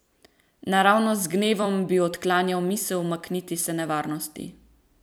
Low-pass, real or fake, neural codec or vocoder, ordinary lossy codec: none; fake; vocoder, 44.1 kHz, 128 mel bands every 256 samples, BigVGAN v2; none